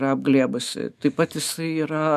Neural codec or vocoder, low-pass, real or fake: autoencoder, 48 kHz, 128 numbers a frame, DAC-VAE, trained on Japanese speech; 14.4 kHz; fake